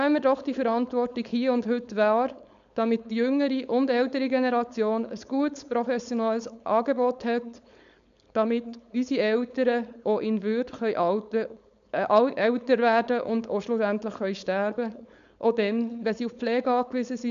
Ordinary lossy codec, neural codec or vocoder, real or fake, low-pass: none; codec, 16 kHz, 4.8 kbps, FACodec; fake; 7.2 kHz